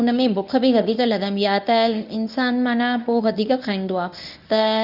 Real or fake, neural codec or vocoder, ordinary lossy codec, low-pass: fake; codec, 24 kHz, 0.9 kbps, WavTokenizer, medium speech release version 1; none; 5.4 kHz